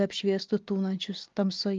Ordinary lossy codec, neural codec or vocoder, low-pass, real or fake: Opus, 24 kbps; none; 7.2 kHz; real